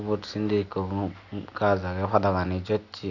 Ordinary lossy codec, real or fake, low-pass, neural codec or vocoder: none; real; 7.2 kHz; none